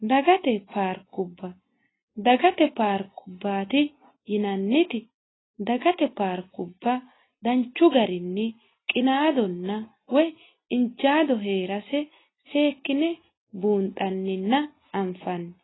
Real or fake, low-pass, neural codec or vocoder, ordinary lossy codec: real; 7.2 kHz; none; AAC, 16 kbps